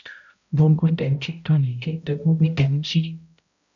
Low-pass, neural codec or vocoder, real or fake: 7.2 kHz; codec, 16 kHz, 0.5 kbps, X-Codec, HuBERT features, trained on balanced general audio; fake